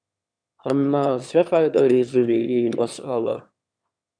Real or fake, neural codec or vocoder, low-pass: fake; autoencoder, 22.05 kHz, a latent of 192 numbers a frame, VITS, trained on one speaker; 9.9 kHz